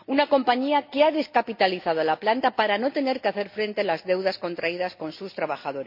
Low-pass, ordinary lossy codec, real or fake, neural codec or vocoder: 5.4 kHz; MP3, 32 kbps; real; none